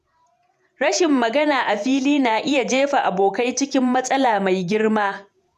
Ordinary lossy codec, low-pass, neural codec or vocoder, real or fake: none; 14.4 kHz; vocoder, 48 kHz, 128 mel bands, Vocos; fake